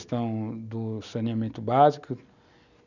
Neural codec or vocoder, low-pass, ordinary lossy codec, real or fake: none; 7.2 kHz; none; real